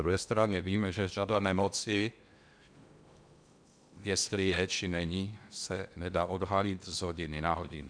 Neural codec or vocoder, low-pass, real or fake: codec, 16 kHz in and 24 kHz out, 0.8 kbps, FocalCodec, streaming, 65536 codes; 9.9 kHz; fake